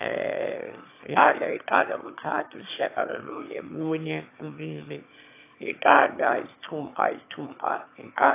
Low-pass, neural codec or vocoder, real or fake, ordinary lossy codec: 3.6 kHz; autoencoder, 22.05 kHz, a latent of 192 numbers a frame, VITS, trained on one speaker; fake; AAC, 24 kbps